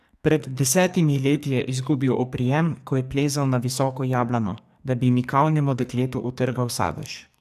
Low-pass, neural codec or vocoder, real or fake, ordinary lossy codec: 14.4 kHz; codec, 44.1 kHz, 2.6 kbps, SNAC; fake; AAC, 96 kbps